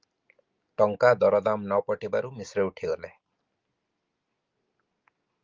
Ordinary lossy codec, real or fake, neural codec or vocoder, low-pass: Opus, 24 kbps; real; none; 7.2 kHz